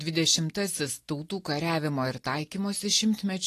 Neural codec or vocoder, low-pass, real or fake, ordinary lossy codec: none; 14.4 kHz; real; AAC, 48 kbps